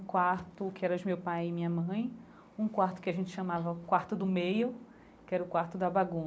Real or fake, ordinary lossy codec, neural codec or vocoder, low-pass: real; none; none; none